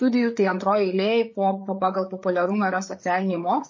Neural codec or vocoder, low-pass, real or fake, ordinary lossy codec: codec, 16 kHz, 8 kbps, FreqCodec, larger model; 7.2 kHz; fake; MP3, 32 kbps